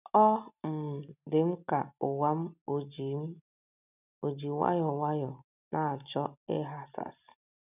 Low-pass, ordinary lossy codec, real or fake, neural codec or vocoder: 3.6 kHz; none; real; none